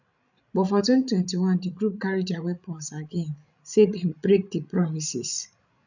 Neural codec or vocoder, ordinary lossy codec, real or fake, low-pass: codec, 16 kHz, 16 kbps, FreqCodec, larger model; none; fake; 7.2 kHz